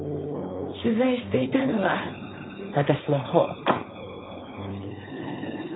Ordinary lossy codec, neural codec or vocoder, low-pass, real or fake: AAC, 16 kbps; codec, 16 kHz, 4.8 kbps, FACodec; 7.2 kHz; fake